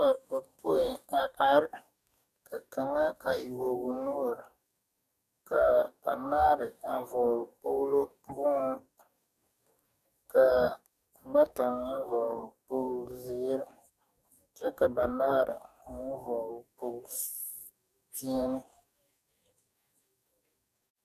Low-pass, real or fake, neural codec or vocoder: 14.4 kHz; fake; codec, 44.1 kHz, 2.6 kbps, DAC